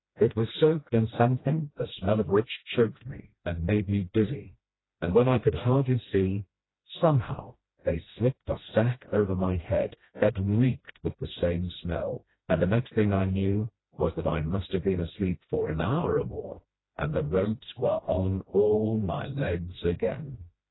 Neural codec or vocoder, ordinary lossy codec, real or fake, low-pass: codec, 16 kHz, 1 kbps, FreqCodec, smaller model; AAC, 16 kbps; fake; 7.2 kHz